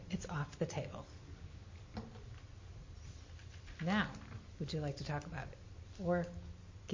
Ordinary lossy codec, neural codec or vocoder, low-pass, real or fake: MP3, 32 kbps; none; 7.2 kHz; real